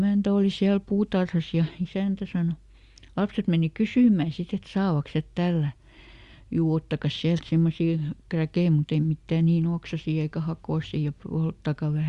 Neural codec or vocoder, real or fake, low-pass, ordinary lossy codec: none; real; 10.8 kHz; Opus, 64 kbps